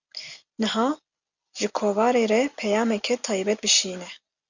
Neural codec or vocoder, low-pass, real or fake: none; 7.2 kHz; real